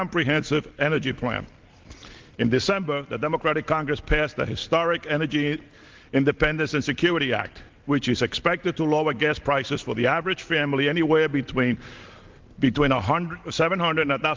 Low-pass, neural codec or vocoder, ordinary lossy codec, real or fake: 7.2 kHz; none; Opus, 16 kbps; real